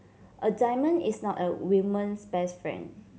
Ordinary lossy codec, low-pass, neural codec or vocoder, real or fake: none; none; none; real